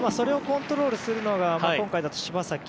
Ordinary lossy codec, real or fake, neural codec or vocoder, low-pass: none; real; none; none